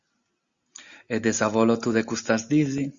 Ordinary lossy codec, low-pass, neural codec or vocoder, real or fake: Opus, 64 kbps; 7.2 kHz; none; real